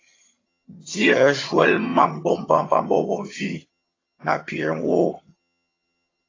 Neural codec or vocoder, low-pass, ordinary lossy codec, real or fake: vocoder, 22.05 kHz, 80 mel bands, HiFi-GAN; 7.2 kHz; AAC, 32 kbps; fake